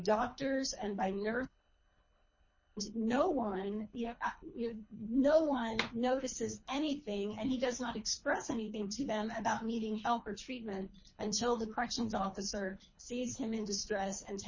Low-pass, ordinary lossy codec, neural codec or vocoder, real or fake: 7.2 kHz; MP3, 32 kbps; codec, 24 kHz, 3 kbps, HILCodec; fake